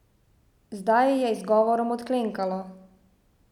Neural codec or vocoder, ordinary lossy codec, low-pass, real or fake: none; none; 19.8 kHz; real